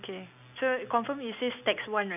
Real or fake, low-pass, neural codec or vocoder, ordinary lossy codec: real; 3.6 kHz; none; none